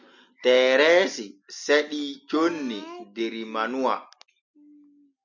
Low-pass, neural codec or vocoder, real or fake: 7.2 kHz; none; real